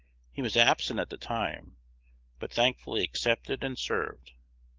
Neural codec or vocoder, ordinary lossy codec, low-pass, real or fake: none; Opus, 16 kbps; 7.2 kHz; real